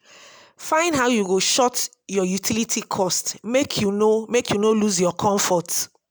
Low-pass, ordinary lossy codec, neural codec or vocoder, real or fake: none; none; none; real